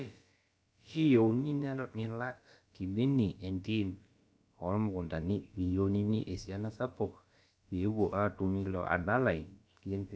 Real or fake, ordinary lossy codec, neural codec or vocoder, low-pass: fake; none; codec, 16 kHz, about 1 kbps, DyCAST, with the encoder's durations; none